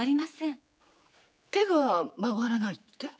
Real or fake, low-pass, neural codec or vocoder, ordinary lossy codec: fake; none; codec, 16 kHz, 4 kbps, X-Codec, HuBERT features, trained on general audio; none